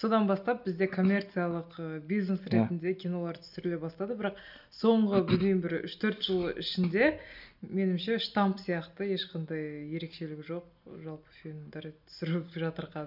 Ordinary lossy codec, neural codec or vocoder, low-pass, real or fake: none; none; 5.4 kHz; real